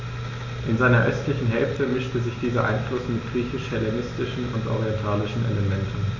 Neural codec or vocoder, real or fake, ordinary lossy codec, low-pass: none; real; none; 7.2 kHz